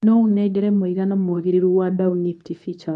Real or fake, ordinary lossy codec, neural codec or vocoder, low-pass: fake; AAC, 96 kbps; codec, 24 kHz, 0.9 kbps, WavTokenizer, medium speech release version 2; 10.8 kHz